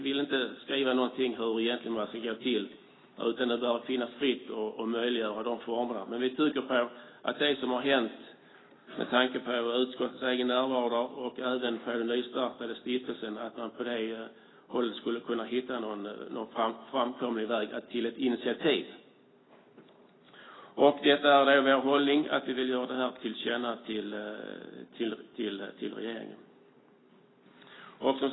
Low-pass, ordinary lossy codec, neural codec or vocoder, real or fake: 7.2 kHz; AAC, 16 kbps; none; real